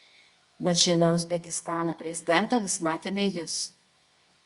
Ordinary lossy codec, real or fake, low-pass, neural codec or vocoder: Opus, 64 kbps; fake; 10.8 kHz; codec, 24 kHz, 0.9 kbps, WavTokenizer, medium music audio release